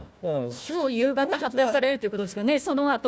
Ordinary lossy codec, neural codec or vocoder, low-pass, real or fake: none; codec, 16 kHz, 1 kbps, FunCodec, trained on Chinese and English, 50 frames a second; none; fake